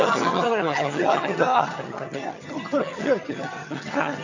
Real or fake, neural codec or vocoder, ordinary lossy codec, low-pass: fake; vocoder, 22.05 kHz, 80 mel bands, HiFi-GAN; AAC, 48 kbps; 7.2 kHz